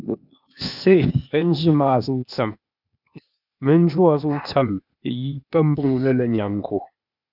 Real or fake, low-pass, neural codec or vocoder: fake; 5.4 kHz; codec, 16 kHz, 0.8 kbps, ZipCodec